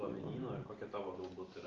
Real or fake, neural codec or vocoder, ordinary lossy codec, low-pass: real; none; Opus, 32 kbps; 7.2 kHz